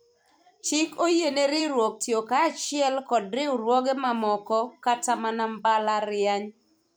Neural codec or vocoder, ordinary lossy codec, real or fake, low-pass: vocoder, 44.1 kHz, 128 mel bands every 256 samples, BigVGAN v2; none; fake; none